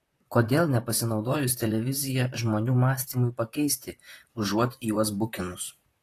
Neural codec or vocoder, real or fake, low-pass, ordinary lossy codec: vocoder, 44.1 kHz, 128 mel bands, Pupu-Vocoder; fake; 14.4 kHz; AAC, 48 kbps